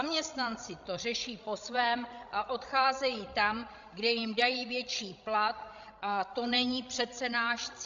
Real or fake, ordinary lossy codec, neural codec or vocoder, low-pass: fake; AAC, 64 kbps; codec, 16 kHz, 16 kbps, FreqCodec, larger model; 7.2 kHz